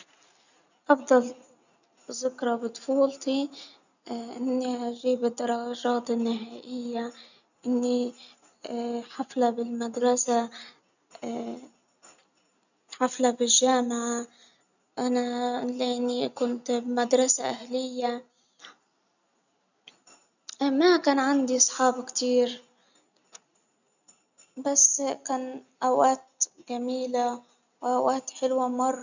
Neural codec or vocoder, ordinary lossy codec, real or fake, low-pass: none; none; real; 7.2 kHz